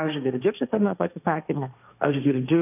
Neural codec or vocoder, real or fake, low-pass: codec, 16 kHz, 1.1 kbps, Voila-Tokenizer; fake; 3.6 kHz